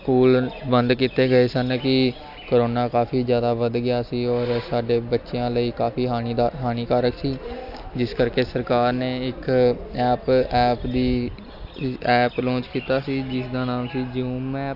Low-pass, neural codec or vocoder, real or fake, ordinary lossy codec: 5.4 kHz; none; real; AAC, 48 kbps